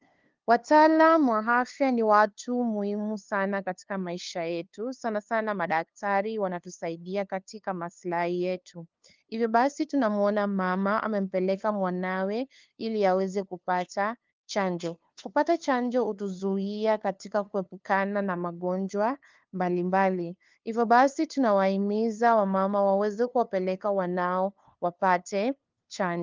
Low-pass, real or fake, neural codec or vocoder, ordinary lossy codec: 7.2 kHz; fake; codec, 16 kHz, 2 kbps, FunCodec, trained on LibriTTS, 25 frames a second; Opus, 32 kbps